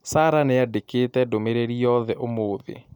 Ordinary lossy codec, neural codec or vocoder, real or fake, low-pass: none; none; real; 19.8 kHz